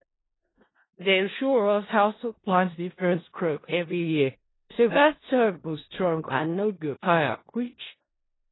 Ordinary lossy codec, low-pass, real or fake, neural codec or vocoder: AAC, 16 kbps; 7.2 kHz; fake; codec, 16 kHz in and 24 kHz out, 0.4 kbps, LongCat-Audio-Codec, four codebook decoder